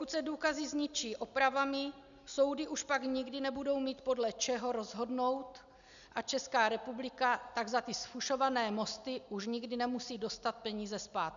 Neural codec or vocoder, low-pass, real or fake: none; 7.2 kHz; real